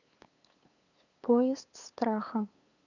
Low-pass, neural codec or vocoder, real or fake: 7.2 kHz; codec, 16 kHz, 8 kbps, FunCodec, trained on LibriTTS, 25 frames a second; fake